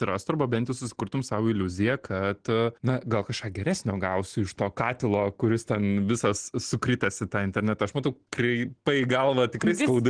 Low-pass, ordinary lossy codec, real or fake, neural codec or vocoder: 9.9 kHz; Opus, 16 kbps; real; none